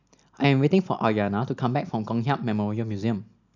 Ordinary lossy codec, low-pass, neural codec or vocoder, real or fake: none; 7.2 kHz; none; real